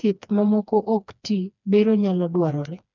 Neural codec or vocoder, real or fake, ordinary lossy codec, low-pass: codec, 16 kHz, 2 kbps, FreqCodec, smaller model; fake; none; 7.2 kHz